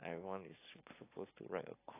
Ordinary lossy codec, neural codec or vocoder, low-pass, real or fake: AAC, 16 kbps; none; 3.6 kHz; real